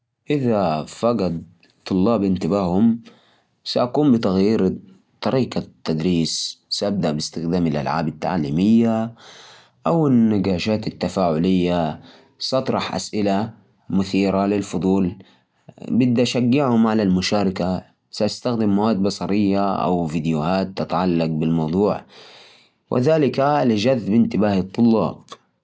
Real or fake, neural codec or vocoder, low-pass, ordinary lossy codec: real; none; none; none